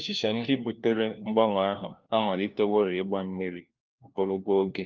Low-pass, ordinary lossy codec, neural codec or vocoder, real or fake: 7.2 kHz; Opus, 24 kbps; codec, 16 kHz, 1 kbps, FunCodec, trained on LibriTTS, 50 frames a second; fake